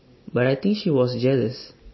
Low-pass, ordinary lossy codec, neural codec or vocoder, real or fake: 7.2 kHz; MP3, 24 kbps; none; real